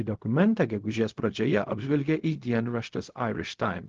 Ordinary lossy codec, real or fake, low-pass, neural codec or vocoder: Opus, 16 kbps; fake; 7.2 kHz; codec, 16 kHz, 0.4 kbps, LongCat-Audio-Codec